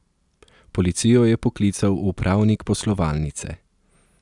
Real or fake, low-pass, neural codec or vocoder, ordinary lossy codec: real; 10.8 kHz; none; none